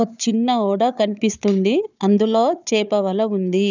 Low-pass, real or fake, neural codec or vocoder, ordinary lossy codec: 7.2 kHz; fake; codec, 16 kHz, 4 kbps, FunCodec, trained on Chinese and English, 50 frames a second; none